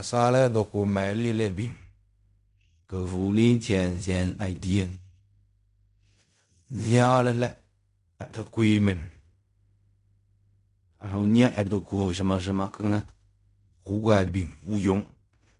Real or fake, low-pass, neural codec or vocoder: fake; 10.8 kHz; codec, 16 kHz in and 24 kHz out, 0.4 kbps, LongCat-Audio-Codec, fine tuned four codebook decoder